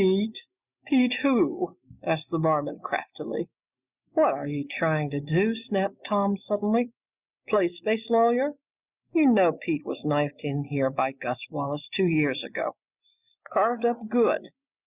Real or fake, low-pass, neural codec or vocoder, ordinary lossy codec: real; 3.6 kHz; none; Opus, 64 kbps